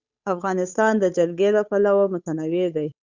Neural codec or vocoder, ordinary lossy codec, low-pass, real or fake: codec, 16 kHz, 8 kbps, FunCodec, trained on Chinese and English, 25 frames a second; Opus, 64 kbps; 7.2 kHz; fake